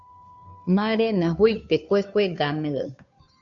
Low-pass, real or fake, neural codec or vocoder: 7.2 kHz; fake; codec, 16 kHz, 2 kbps, FunCodec, trained on Chinese and English, 25 frames a second